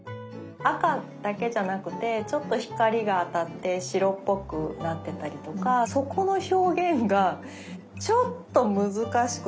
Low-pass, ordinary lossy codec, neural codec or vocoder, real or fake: none; none; none; real